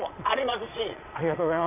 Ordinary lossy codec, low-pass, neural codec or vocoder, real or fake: none; 3.6 kHz; vocoder, 22.05 kHz, 80 mel bands, Vocos; fake